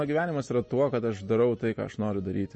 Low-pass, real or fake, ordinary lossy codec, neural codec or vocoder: 9.9 kHz; real; MP3, 32 kbps; none